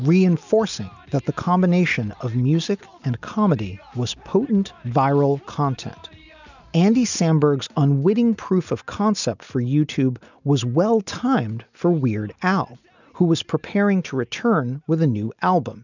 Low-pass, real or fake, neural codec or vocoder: 7.2 kHz; real; none